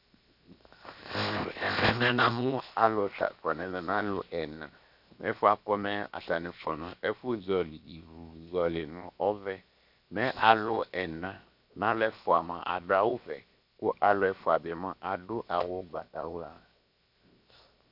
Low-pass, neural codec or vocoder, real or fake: 5.4 kHz; codec, 16 kHz, 0.7 kbps, FocalCodec; fake